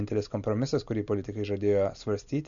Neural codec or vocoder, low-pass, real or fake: none; 7.2 kHz; real